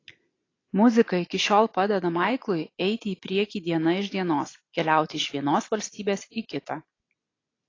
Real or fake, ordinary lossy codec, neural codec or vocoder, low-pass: real; AAC, 32 kbps; none; 7.2 kHz